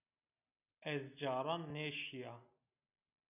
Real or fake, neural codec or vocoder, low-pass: real; none; 3.6 kHz